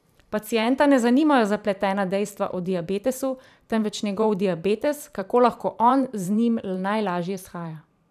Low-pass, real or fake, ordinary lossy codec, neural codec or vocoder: 14.4 kHz; fake; none; vocoder, 44.1 kHz, 128 mel bands every 512 samples, BigVGAN v2